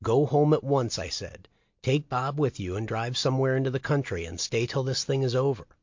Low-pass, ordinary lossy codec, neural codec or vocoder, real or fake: 7.2 kHz; MP3, 48 kbps; none; real